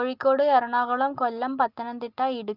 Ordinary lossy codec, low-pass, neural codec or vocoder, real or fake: Opus, 24 kbps; 5.4 kHz; none; real